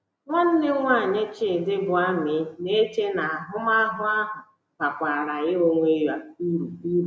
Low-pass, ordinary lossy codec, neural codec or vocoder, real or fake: none; none; none; real